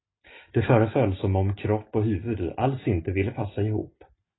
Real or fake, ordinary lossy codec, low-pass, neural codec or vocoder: real; AAC, 16 kbps; 7.2 kHz; none